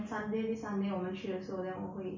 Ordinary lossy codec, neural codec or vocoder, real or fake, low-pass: MP3, 32 kbps; none; real; 7.2 kHz